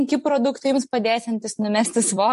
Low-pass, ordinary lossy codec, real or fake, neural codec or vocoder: 14.4 kHz; MP3, 48 kbps; fake; vocoder, 44.1 kHz, 128 mel bands every 256 samples, BigVGAN v2